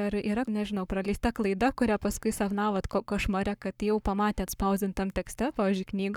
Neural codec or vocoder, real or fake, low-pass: codec, 44.1 kHz, 7.8 kbps, Pupu-Codec; fake; 19.8 kHz